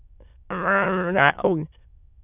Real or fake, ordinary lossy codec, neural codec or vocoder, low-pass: fake; none; autoencoder, 22.05 kHz, a latent of 192 numbers a frame, VITS, trained on many speakers; 3.6 kHz